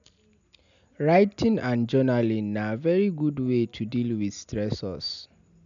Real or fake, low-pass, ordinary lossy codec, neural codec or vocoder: real; 7.2 kHz; none; none